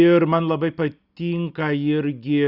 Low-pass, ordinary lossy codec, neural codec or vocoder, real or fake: 5.4 kHz; Opus, 64 kbps; none; real